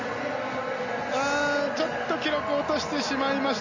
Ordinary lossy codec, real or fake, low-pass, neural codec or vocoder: Opus, 64 kbps; real; 7.2 kHz; none